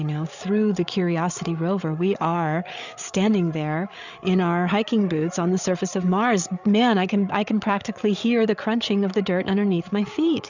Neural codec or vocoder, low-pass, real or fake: codec, 16 kHz, 8 kbps, FreqCodec, larger model; 7.2 kHz; fake